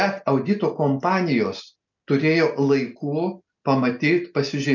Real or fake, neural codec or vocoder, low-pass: real; none; 7.2 kHz